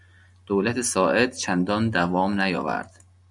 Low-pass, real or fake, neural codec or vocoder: 10.8 kHz; real; none